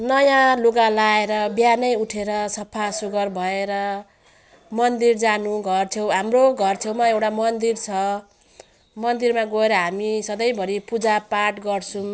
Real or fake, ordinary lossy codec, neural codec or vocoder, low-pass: real; none; none; none